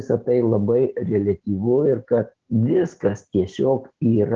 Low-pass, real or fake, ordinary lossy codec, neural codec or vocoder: 7.2 kHz; real; Opus, 16 kbps; none